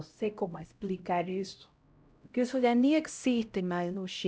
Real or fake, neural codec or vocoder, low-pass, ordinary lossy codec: fake; codec, 16 kHz, 0.5 kbps, X-Codec, HuBERT features, trained on LibriSpeech; none; none